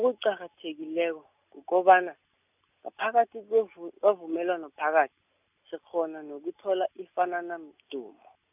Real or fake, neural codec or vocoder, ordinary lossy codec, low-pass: real; none; none; 3.6 kHz